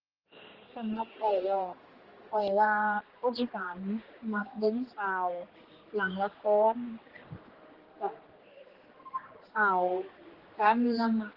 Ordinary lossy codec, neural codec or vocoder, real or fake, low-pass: Opus, 16 kbps; codec, 16 kHz, 2 kbps, X-Codec, HuBERT features, trained on general audio; fake; 5.4 kHz